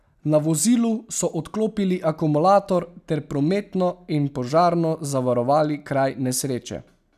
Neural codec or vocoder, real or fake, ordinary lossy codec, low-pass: none; real; none; 14.4 kHz